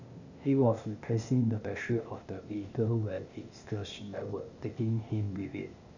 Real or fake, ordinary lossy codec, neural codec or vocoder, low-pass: fake; none; codec, 16 kHz, 0.8 kbps, ZipCodec; 7.2 kHz